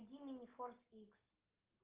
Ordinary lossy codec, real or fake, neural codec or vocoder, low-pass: Opus, 32 kbps; real; none; 3.6 kHz